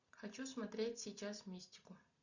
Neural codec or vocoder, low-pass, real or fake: none; 7.2 kHz; real